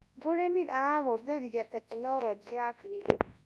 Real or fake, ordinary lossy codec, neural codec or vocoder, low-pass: fake; none; codec, 24 kHz, 0.9 kbps, WavTokenizer, large speech release; none